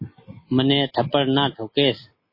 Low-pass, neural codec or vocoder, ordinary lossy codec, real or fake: 5.4 kHz; none; MP3, 24 kbps; real